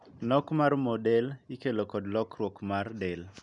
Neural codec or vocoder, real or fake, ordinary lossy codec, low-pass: none; real; none; 10.8 kHz